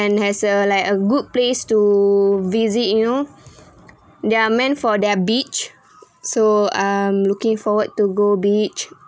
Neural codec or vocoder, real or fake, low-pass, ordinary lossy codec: none; real; none; none